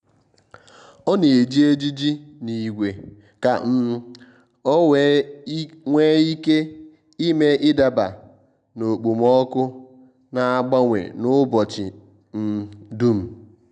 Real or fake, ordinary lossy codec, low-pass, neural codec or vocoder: real; none; 9.9 kHz; none